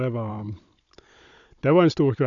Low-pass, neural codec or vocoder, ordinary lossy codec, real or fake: 7.2 kHz; codec, 16 kHz, 16 kbps, FunCodec, trained on Chinese and English, 50 frames a second; none; fake